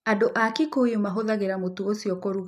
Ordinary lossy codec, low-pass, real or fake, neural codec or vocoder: none; 14.4 kHz; real; none